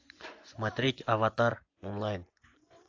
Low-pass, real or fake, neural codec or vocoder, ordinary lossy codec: 7.2 kHz; fake; vocoder, 24 kHz, 100 mel bands, Vocos; AAC, 48 kbps